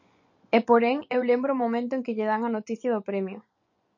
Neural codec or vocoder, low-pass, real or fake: vocoder, 24 kHz, 100 mel bands, Vocos; 7.2 kHz; fake